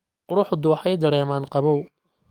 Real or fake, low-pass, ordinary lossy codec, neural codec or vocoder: fake; 19.8 kHz; Opus, 32 kbps; codec, 44.1 kHz, 7.8 kbps, DAC